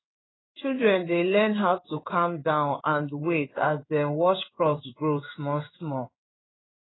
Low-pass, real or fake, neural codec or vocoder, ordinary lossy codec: 7.2 kHz; fake; codec, 16 kHz in and 24 kHz out, 1 kbps, XY-Tokenizer; AAC, 16 kbps